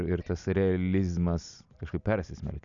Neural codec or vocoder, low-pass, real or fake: none; 7.2 kHz; real